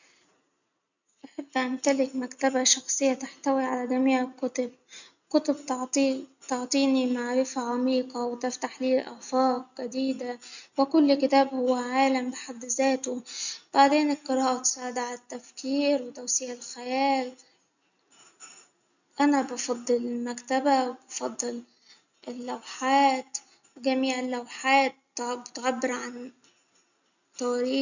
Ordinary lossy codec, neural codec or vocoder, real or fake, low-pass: none; none; real; 7.2 kHz